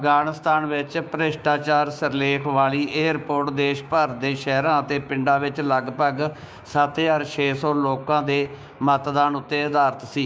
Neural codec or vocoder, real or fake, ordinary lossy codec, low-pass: codec, 16 kHz, 6 kbps, DAC; fake; none; none